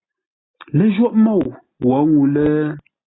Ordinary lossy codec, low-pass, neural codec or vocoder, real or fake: AAC, 16 kbps; 7.2 kHz; none; real